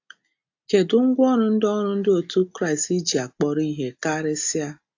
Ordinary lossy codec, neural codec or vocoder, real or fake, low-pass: AAC, 48 kbps; none; real; 7.2 kHz